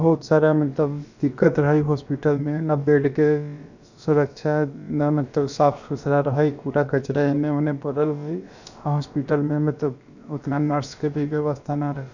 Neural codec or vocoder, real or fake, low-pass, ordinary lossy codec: codec, 16 kHz, about 1 kbps, DyCAST, with the encoder's durations; fake; 7.2 kHz; none